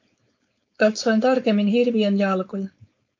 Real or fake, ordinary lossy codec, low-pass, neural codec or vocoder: fake; MP3, 64 kbps; 7.2 kHz; codec, 16 kHz, 4.8 kbps, FACodec